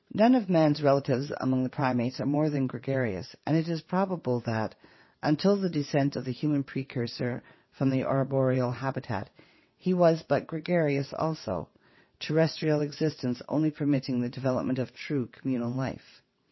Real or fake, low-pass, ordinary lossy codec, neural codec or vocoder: fake; 7.2 kHz; MP3, 24 kbps; vocoder, 22.05 kHz, 80 mel bands, WaveNeXt